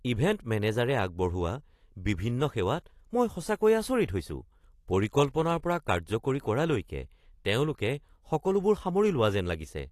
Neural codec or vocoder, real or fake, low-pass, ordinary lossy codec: none; real; 14.4 kHz; AAC, 48 kbps